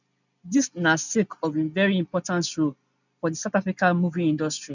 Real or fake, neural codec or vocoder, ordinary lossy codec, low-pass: real; none; none; 7.2 kHz